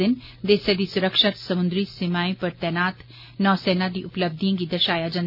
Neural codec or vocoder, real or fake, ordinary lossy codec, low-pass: none; real; none; 5.4 kHz